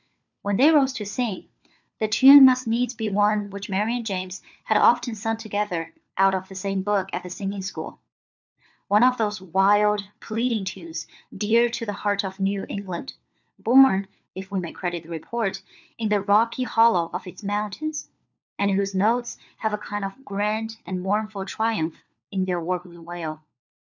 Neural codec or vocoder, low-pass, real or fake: codec, 16 kHz, 4 kbps, FunCodec, trained on LibriTTS, 50 frames a second; 7.2 kHz; fake